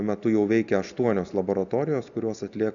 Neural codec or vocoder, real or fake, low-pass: none; real; 7.2 kHz